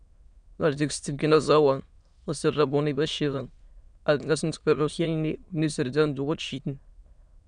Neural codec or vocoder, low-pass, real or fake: autoencoder, 22.05 kHz, a latent of 192 numbers a frame, VITS, trained on many speakers; 9.9 kHz; fake